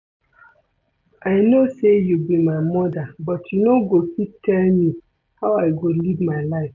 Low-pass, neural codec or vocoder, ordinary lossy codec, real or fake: 7.2 kHz; none; none; real